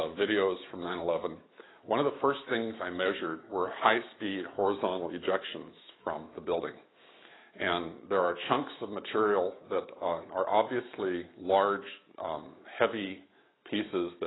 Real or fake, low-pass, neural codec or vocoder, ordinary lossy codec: fake; 7.2 kHz; autoencoder, 48 kHz, 128 numbers a frame, DAC-VAE, trained on Japanese speech; AAC, 16 kbps